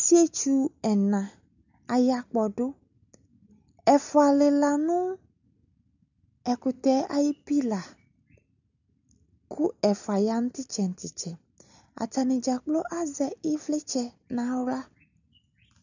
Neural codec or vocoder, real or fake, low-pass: none; real; 7.2 kHz